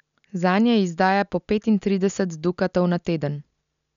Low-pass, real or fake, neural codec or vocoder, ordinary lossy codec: 7.2 kHz; real; none; none